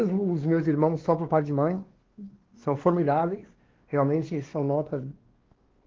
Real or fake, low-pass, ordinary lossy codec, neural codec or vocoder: fake; 7.2 kHz; Opus, 16 kbps; codec, 16 kHz, 2 kbps, X-Codec, WavLM features, trained on Multilingual LibriSpeech